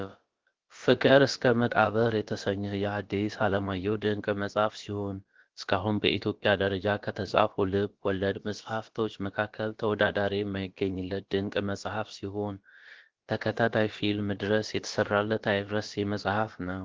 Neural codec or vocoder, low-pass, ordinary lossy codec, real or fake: codec, 16 kHz, about 1 kbps, DyCAST, with the encoder's durations; 7.2 kHz; Opus, 16 kbps; fake